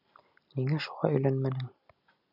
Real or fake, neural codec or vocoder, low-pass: real; none; 5.4 kHz